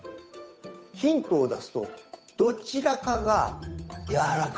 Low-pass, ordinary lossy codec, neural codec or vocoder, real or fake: none; none; codec, 16 kHz, 8 kbps, FunCodec, trained on Chinese and English, 25 frames a second; fake